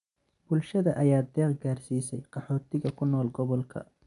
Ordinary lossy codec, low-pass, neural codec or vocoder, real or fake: none; 10.8 kHz; none; real